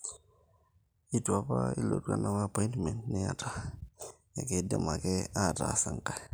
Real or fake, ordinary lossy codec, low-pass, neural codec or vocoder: real; none; none; none